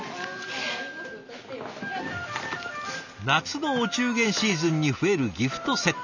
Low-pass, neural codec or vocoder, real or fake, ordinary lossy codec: 7.2 kHz; none; real; none